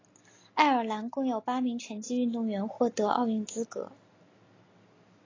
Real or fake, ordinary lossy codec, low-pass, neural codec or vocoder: real; AAC, 32 kbps; 7.2 kHz; none